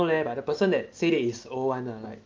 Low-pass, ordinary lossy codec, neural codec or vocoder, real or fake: 7.2 kHz; Opus, 24 kbps; none; real